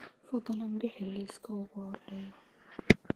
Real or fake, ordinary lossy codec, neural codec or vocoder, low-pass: fake; Opus, 16 kbps; codec, 32 kHz, 1.9 kbps, SNAC; 14.4 kHz